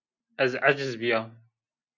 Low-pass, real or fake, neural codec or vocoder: 7.2 kHz; real; none